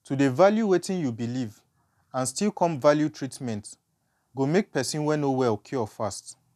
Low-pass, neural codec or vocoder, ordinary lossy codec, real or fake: 14.4 kHz; none; none; real